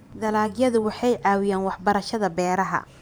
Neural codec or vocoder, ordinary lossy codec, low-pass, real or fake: vocoder, 44.1 kHz, 128 mel bands every 512 samples, BigVGAN v2; none; none; fake